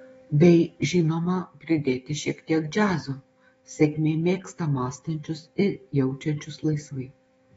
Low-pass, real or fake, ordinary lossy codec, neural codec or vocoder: 19.8 kHz; fake; AAC, 24 kbps; autoencoder, 48 kHz, 128 numbers a frame, DAC-VAE, trained on Japanese speech